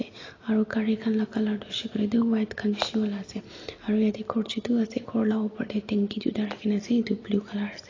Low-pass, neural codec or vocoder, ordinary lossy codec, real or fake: 7.2 kHz; none; AAC, 32 kbps; real